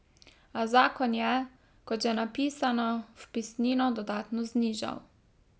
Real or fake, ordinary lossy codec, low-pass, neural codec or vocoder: real; none; none; none